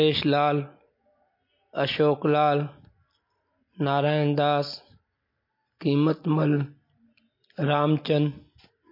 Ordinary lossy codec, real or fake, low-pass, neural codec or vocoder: MP3, 32 kbps; real; 5.4 kHz; none